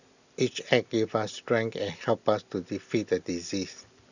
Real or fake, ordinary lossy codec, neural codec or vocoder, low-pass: real; none; none; 7.2 kHz